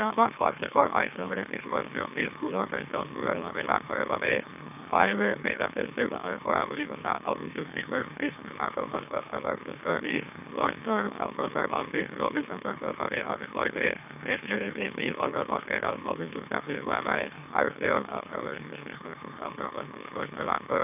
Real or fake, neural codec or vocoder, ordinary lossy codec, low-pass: fake; autoencoder, 44.1 kHz, a latent of 192 numbers a frame, MeloTTS; none; 3.6 kHz